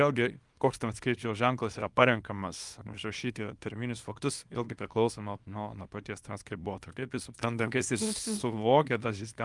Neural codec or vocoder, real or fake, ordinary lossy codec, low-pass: codec, 24 kHz, 0.9 kbps, WavTokenizer, small release; fake; Opus, 32 kbps; 10.8 kHz